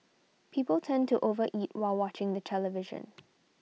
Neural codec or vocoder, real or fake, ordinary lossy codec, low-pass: none; real; none; none